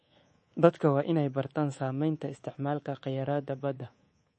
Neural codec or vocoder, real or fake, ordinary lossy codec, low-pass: codec, 24 kHz, 3.1 kbps, DualCodec; fake; MP3, 32 kbps; 10.8 kHz